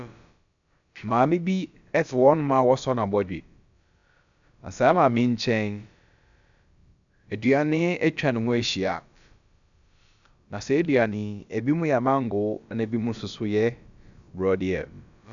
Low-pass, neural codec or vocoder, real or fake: 7.2 kHz; codec, 16 kHz, about 1 kbps, DyCAST, with the encoder's durations; fake